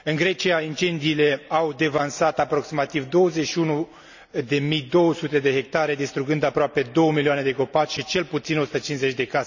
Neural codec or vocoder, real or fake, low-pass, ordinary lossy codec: none; real; 7.2 kHz; none